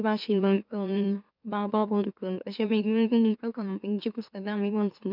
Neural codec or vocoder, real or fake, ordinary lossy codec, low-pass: autoencoder, 44.1 kHz, a latent of 192 numbers a frame, MeloTTS; fake; none; 5.4 kHz